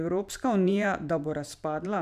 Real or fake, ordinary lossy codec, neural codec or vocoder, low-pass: fake; none; vocoder, 44.1 kHz, 128 mel bands every 512 samples, BigVGAN v2; 14.4 kHz